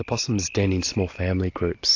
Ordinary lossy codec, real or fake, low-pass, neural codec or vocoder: AAC, 48 kbps; real; 7.2 kHz; none